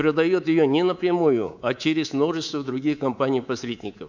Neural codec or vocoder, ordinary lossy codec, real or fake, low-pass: codec, 24 kHz, 3.1 kbps, DualCodec; none; fake; 7.2 kHz